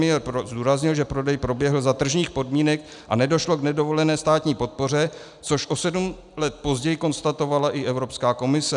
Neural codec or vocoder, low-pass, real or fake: none; 10.8 kHz; real